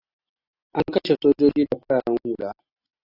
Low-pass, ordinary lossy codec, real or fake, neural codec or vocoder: 5.4 kHz; AAC, 24 kbps; real; none